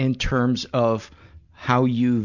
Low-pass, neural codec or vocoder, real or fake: 7.2 kHz; none; real